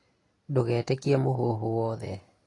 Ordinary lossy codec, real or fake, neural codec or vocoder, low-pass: AAC, 32 kbps; real; none; 10.8 kHz